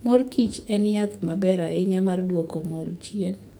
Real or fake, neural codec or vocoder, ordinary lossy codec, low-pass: fake; codec, 44.1 kHz, 2.6 kbps, SNAC; none; none